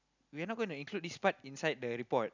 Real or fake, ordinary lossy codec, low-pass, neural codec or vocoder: real; none; 7.2 kHz; none